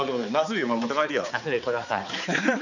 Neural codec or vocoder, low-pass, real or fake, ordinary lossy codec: codec, 16 kHz, 4 kbps, X-Codec, HuBERT features, trained on balanced general audio; 7.2 kHz; fake; none